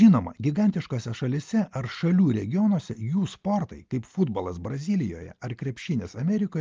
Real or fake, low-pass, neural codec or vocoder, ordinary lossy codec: real; 7.2 kHz; none; Opus, 24 kbps